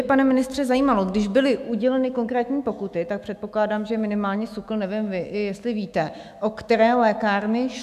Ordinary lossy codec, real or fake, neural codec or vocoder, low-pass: AAC, 96 kbps; fake; autoencoder, 48 kHz, 128 numbers a frame, DAC-VAE, trained on Japanese speech; 14.4 kHz